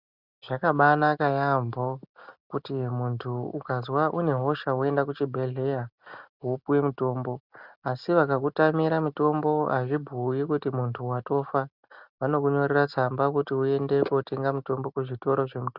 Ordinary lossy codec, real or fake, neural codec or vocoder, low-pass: Opus, 64 kbps; real; none; 5.4 kHz